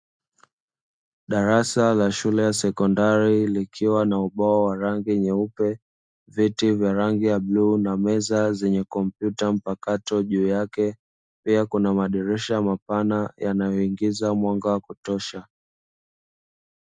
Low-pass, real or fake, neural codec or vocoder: 9.9 kHz; real; none